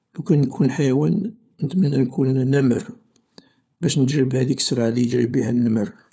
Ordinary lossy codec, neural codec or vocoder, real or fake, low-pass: none; codec, 16 kHz, 4 kbps, FunCodec, trained on LibriTTS, 50 frames a second; fake; none